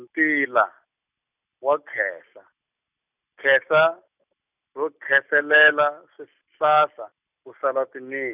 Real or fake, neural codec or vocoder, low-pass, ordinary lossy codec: real; none; 3.6 kHz; none